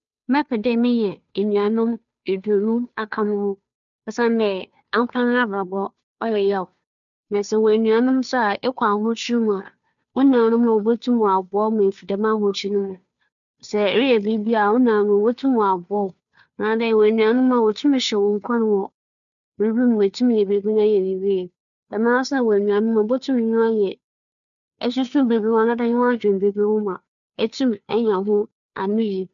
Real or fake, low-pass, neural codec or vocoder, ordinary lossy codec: fake; 7.2 kHz; codec, 16 kHz, 2 kbps, FunCodec, trained on Chinese and English, 25 frames a second; none